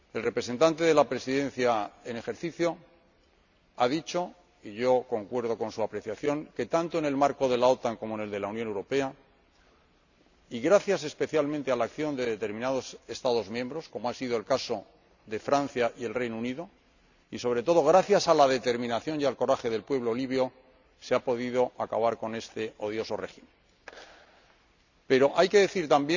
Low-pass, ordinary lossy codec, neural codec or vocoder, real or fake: 7.2 kHz; none; none; real